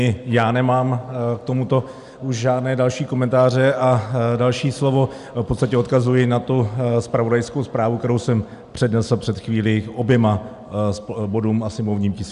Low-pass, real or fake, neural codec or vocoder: 10.8 kHz; real; none